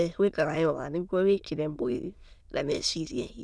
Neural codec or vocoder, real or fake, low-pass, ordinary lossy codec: autoencoder, 22.05 kHz, a latent of 192 numbers a frame, VITS, trained on many speakers; fake; none; none